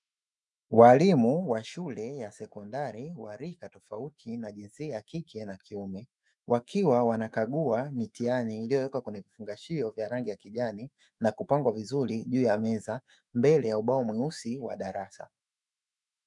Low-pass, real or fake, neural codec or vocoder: 10.8 kHz; fake; autoencoder, 48 kHz, 128 numbers a frame, DAC-VAE, trained on Japanese speech